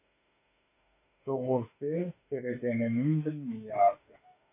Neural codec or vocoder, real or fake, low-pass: autoencoder, 48 kHz, 32 numbers a frame, DAC-VAE, trained on Japanese speech; fake; 3.6 kHz